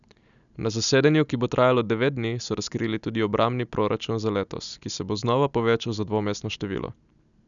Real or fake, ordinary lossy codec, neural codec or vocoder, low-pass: real; none; none; 7.2 kHz